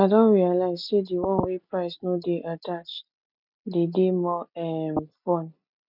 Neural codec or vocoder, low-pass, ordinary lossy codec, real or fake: none; 5.4 kHz; none; real